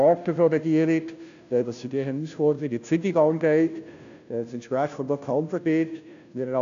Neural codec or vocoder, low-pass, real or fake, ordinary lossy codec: codec, 16 kHz, 0.5 kbps, FunCodec, trained on Chinese and English, 25 frames a second; 7.2 kHz; fake; AAC, 96 kbps